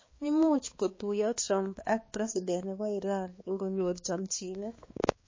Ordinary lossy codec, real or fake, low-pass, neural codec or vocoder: MP3, 32 kbps; fake; 7.2 kHz; codec, 16 kHz, 2 kbps, X-Codec, HuBERT features, trained on balanced general audio